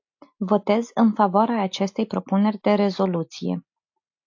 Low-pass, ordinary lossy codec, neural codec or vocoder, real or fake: 7.2 kHz; AAC, 48 kbps; none; real